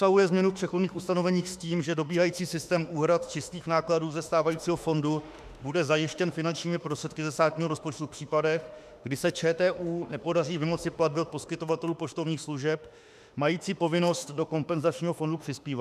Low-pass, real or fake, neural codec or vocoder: 14.4 kHz; fake; autoencoder, 48 kHz, 32 numbers a frame, DAC-VAE, trained on Japanese speech